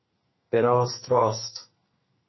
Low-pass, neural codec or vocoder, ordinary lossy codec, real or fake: 7.2 kHz; codec, 32 kHz, 1.9 kbps, SNAC; MP3, 24 kbps; fake